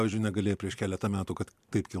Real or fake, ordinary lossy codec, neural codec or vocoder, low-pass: real; MP3, 96 kbps; none; 14.4 kHz